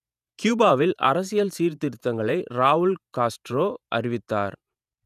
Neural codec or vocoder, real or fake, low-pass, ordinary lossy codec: vocoder, 48 kHz, 128 mel bands, Vocos; fake; 14.4 kHz; none